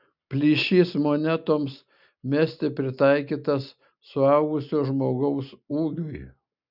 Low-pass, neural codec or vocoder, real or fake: 5.4 kHz; none; real